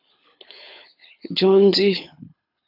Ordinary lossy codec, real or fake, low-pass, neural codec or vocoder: AAC, 48 kbps; fake; 5.4 kHz; codec, 24 kHz, 6 kbps, HILCodec